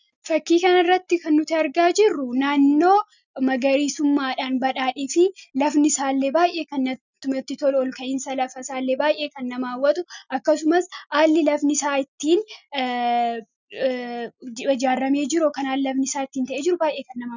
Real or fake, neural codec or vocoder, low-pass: real; none; 7.2 kHz